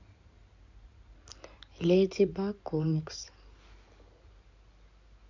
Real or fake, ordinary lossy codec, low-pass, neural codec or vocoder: fake; MP3, 64 kbps; 7.2 kHz; codec, 16 kHz in and 24 kHz out, 2.2 kbps, FireRedTTS-2 codec